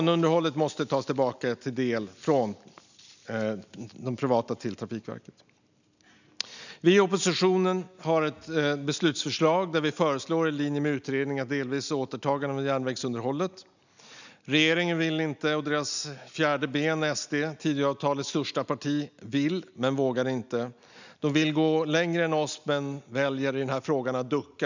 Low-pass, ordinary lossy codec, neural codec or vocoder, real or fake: 7.2 kHz; none; none; real